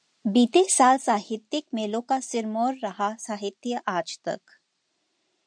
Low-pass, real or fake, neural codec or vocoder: 9.9 kHz; real; none